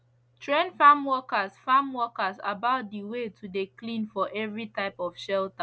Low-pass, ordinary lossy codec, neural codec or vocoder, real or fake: none; none; none; real